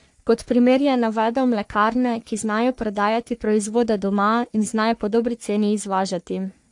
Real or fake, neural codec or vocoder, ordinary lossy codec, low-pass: fake; codec, 44.1 kHz, 3.4 kbps, Pupu-Codec; AAC, 64 kbps; 10.8 kHz